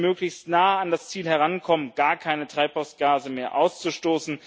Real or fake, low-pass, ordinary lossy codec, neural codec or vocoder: real; none; none; none